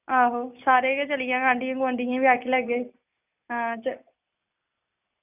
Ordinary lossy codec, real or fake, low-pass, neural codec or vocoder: none; real; 3.6 kHz; none